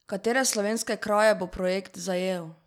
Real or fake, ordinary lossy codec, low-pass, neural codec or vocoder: real; none; 19.8 kHz; none